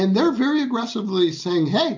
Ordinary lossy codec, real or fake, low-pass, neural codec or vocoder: MP3, 64 kbps; real; 7.2 kHz; none